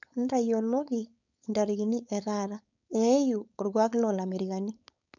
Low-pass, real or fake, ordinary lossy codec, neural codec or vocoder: 7.2 kHz; fake; none; codec, 16 kHz, 4.8 kbps, FACodec